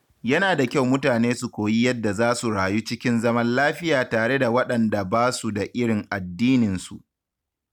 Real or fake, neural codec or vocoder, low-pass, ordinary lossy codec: real; none; 19.8 kHz; none